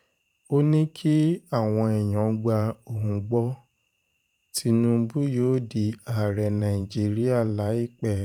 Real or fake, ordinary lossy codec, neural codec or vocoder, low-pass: fake; none; vocoder, 44.1 kHz, 128 mel bands every 512 samples, BigVGAN v2; 19.8 kHz